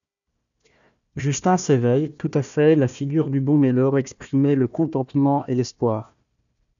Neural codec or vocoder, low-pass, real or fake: codec, 16 kHz, 1 kbps, FunCodec, trained on Chinese and English, 50 frames a second; 7.2 kHz; fake